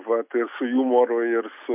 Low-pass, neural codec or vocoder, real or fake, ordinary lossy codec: 3.6 kHz; none; real; MP3, 32 kbps